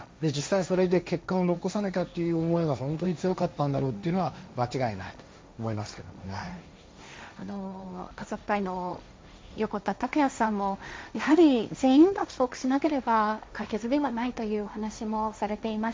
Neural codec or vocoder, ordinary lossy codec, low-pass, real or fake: codec, 16 kHz, 1.1 kbps, Voila-Tokenizer; none; none; fake